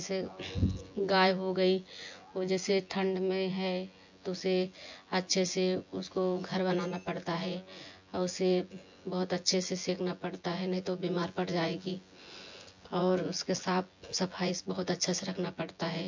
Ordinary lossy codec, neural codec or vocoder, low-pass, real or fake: MP3, 48 kbps; vocoder, 24 kHz, 100 mel bands, Vocos; 7.2 kHz; fake